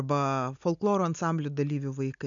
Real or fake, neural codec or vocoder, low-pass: real; none; 7.2 kHz